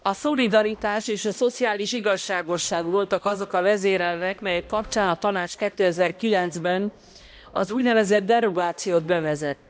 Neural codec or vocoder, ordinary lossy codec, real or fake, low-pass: codec, 16 kHz, 1 kbps, X-Codec, HuBERT features, trained on balanced general audio; none; fake; none